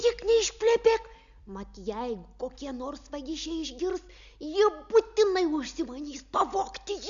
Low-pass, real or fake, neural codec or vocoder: 7.2 kHz; real; none